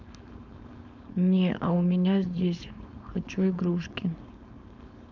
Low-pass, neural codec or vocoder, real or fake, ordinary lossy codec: 7.2 kHz; codec, 16 kHz, 4.8 kbps, FACodec; fake; none